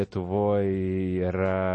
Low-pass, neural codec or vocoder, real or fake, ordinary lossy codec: 10.8 kHz; none; real; MP3, 32 kbps